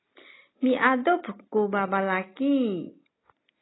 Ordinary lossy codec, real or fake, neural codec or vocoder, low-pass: AAC, 16 kbps; real; none; 7.2 kHz